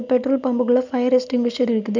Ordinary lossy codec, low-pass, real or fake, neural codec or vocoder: none; 7.2 kHz; real; none